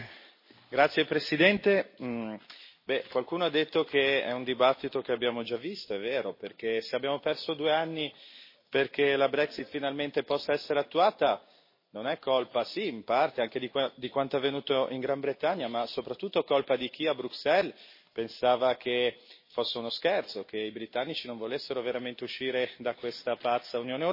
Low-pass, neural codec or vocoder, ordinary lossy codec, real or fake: 5.4 kHz; none; MP3, 24 kbps; real